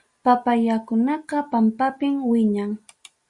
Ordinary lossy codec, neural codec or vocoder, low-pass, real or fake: Opus, 64 kbps; none; 10.8 kHz; real